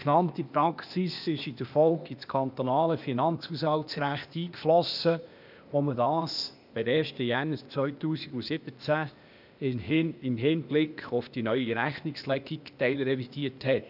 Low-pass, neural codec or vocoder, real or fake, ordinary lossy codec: 5.4 kHz; codec, 16 kHz, 0.8 kbps, ZipCodec; fake; none